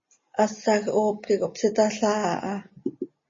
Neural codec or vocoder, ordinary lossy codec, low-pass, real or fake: none; MP3, 32 kbps; 7.2 kHz; real